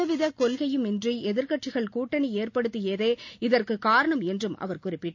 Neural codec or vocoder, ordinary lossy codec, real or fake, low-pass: none; AAC, 48 kbps; real; 7.2 kHz